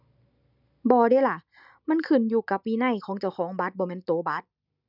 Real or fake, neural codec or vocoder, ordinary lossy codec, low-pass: real; none; none; 5.4 kHz